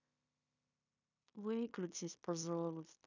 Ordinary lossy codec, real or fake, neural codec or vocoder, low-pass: none; fake; codec, 16 kHz in and 24 kHz out, 0.9 kbps, LongCat-Audio-Codec, fine tuned four codebook decoder; 7.2 kHz